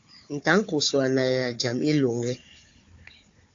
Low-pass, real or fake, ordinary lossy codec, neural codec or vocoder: 7.2 kHz; fake; AAC, 48 kbps; codec, 16 kHz, 4 kbps, FunCodec, trained on Chinese and English, 50 frames a second